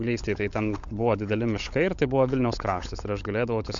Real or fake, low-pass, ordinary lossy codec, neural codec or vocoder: fake; 7.2 kHz; MP3, 64 kbps; codec, 16 kHz, 16 kbps, FunCodec, trained on LibriTTS, 50 frames a second